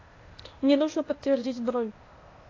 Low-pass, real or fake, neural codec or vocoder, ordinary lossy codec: 7.2 kHz; fake; codec, 16 kHz, 0.8 kbps, ZipCodec; AAC, 32 kbps